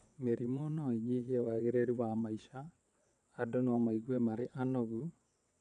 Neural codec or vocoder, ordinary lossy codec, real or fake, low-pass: vocoder, 22.05 kHz, 80 mel bands, WaveNeXt; none; fake; 9.9 kHz